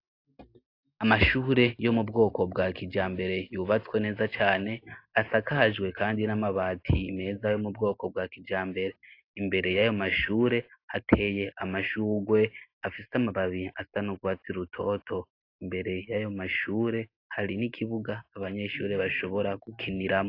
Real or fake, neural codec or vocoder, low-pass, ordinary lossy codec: real; none; 5.4 kHz; AAC, 32 kbps